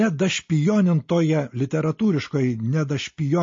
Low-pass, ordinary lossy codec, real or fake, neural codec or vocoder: 7.2 kHz; MP3, 32 kbps; real; none